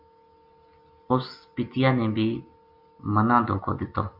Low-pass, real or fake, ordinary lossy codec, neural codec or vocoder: 5.4 kHz; real; MP3, 48 kbps; none